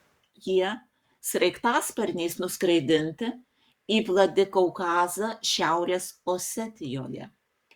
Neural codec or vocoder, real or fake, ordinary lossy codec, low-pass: codec, 44.1 kHz, 7.8 kbps, Pupu-Codec; fake; Opus, 64 kbps; 19.8 kHz